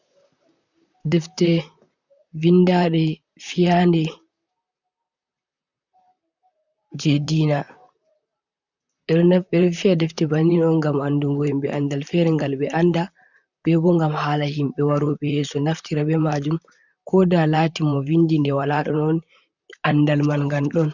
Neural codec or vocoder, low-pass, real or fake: vocoder, 44.1 kHz, 128 mel bands, Pupu-Vocoder; 7.2 kHz; fake